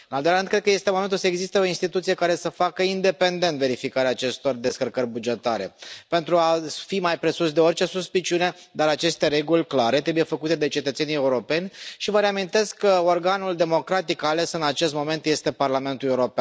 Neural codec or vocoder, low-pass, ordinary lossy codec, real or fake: none; none; none; real